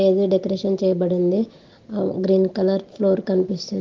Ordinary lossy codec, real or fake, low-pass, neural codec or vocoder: Opus, 16 kbps; real; 7.2 kHz; none